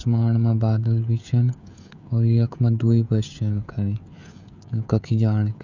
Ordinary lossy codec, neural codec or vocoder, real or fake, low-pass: none; codec, 16 kHz, 16 kbps, FreqCodec, smaller model; fake; 7.2 kHz